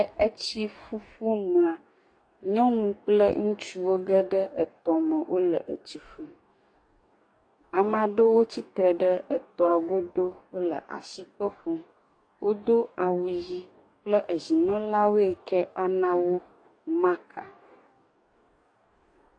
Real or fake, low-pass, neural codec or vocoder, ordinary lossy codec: fake; 9.9 kHz; codec, 44.1 kHz, 2.6 kbps, DAC; AAC, 48 kbps